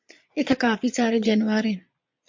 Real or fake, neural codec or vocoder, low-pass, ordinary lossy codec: fake; vocoder, 22.05 kHz, 80 mel bands, Vocos; 7.2 kHz; MP3, 48 kbps